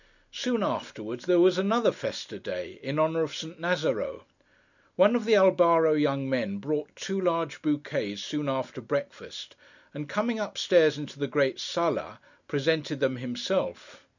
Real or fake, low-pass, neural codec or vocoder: real; 7.2 kHz; none